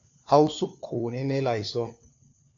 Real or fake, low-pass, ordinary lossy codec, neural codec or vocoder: fake; 7.2 kHz; AAC, 48 kbps; codec, 16 kHz, 4 kbps, FunCodec, trained on LibriTTS, 50 frames a second